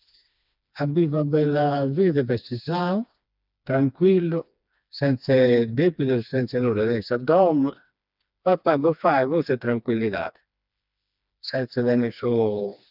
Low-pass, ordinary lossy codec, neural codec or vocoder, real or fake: 5.4 kHz; none; codec, 16 kHz, 2 kbps, FreqCodec, smaller model; fake